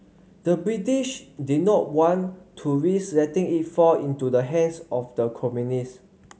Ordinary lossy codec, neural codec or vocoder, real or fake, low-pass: none; none; real; none